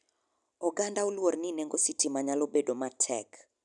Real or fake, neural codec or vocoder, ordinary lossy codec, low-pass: real; none; none; 10.8 kHz